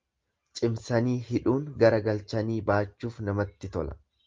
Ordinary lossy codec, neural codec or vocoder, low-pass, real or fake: Opus, 32 kbps; none; 7.2 kHz; real